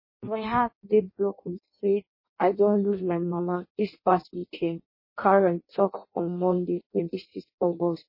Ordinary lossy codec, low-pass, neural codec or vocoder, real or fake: MP3, 24 kbps; 5.4 kHz; codec, 16 kHz in and 24 kHz out, 0.6 kbps, FireRedTTS-2 codec; fake